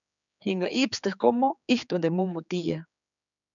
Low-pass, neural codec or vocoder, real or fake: 7.2 kHz; codec, 16 kHz, 4 kbps, X-Codec, HuBERT features, trained on general audio; fake